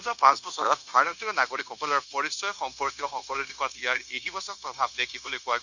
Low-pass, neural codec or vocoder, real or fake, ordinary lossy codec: 7.2 kHz; codec, 16 kHz, 0.9 kbps, LongCat-Audio-Codec; fake; none